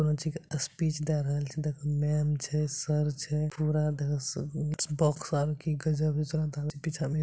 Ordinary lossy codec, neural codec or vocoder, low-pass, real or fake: none; none; none; real